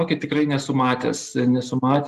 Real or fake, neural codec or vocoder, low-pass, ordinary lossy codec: real; none; 14.4 kHz; Opus, 24 kbps